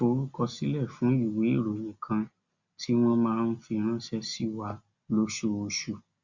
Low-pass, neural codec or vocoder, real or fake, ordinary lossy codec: 7.2 kHz; none; real; Opus, 64 kbps